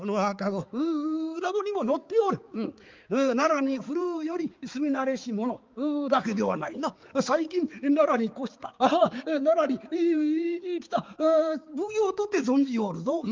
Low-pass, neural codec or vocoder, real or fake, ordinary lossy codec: 7.2 kHz; codec, 16 kHz, 4 kbps, X-Codec, HuBERT features, trained on balanced general audio; fake; Opus, 32 kbps